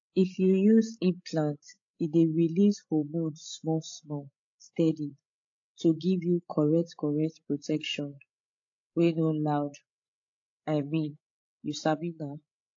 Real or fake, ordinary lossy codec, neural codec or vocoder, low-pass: fake; AAC, 48 kbps; codec, 16 kHz, 16 kbps, FreqCodec, larger model; 7.2 kHz